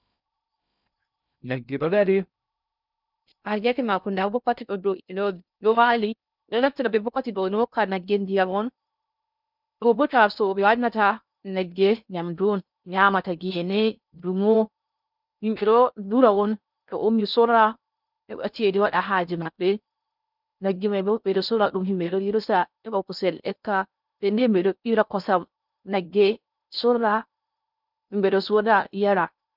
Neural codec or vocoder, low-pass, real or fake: codec, 16 kHz in and 24 kHz out, 0.6 kbps, FocalCodec, streaming, 2048 codes; 5.4 kHz; fake